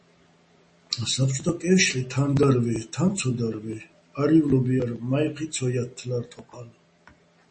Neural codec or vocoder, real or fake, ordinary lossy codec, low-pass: none; real; MP3, 32 kbps; 10.8 kHz